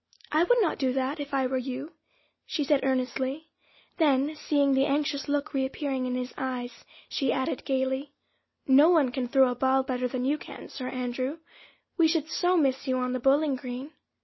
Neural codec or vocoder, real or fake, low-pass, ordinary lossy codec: none; real; 7.2 kHz; MP3, 24 kbps